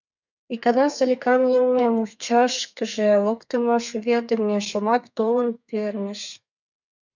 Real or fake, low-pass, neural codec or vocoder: fake; 7.2 kHz; codec, 32 kHz, 1.9 kbps, SNAC